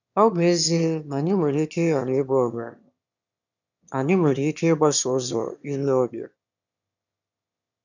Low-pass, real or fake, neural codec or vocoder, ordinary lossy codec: 7.2 kHz; fake; autoencoder, 22.05 kHz, a latent of 192 numbers a frame, VITS, trained on one speaker; none